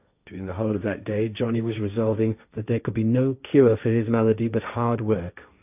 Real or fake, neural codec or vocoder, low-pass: fake; codec, 16 kHz, 1.1 kbps, Voila-Tokenizer; 3.6 kHz